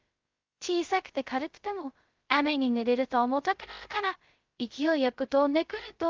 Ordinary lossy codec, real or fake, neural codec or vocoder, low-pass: Opus, 32 kbps; fake; codec, 16 kHz, 0.2 kbps, FocalCodec; 7.2 kHz